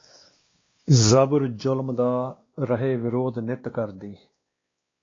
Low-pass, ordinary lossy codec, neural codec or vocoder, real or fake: 7.2 kHz; AAC, 32 kbps; codec, 16 kHz, 2 kbps, X-Codec, WavLM features, trained on Multilingual LibriSpeech; fake